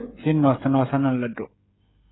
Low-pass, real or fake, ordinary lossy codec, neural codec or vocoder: 7.2 kHz; fake; AAC, 16 kbps; codec, 16 kHz, 16 kbps, FreqCodec, smaller model